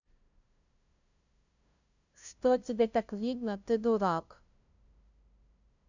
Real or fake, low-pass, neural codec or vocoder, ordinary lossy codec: fake; 7.2 kHz; codec, 16 kHz, 0.5 kbps, FunCodec, trained on LibriTTS, 25 frames a second; none